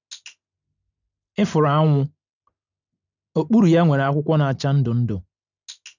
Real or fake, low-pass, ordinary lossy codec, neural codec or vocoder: real; 7.2 kHz; none; none